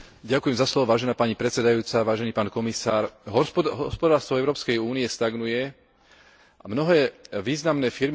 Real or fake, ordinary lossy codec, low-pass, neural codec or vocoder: real; none; none; none